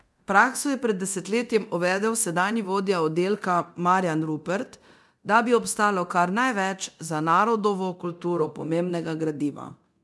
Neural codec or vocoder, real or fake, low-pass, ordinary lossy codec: codec, 24 kHz, 0.9 kbps, DualCodec; fake; none; none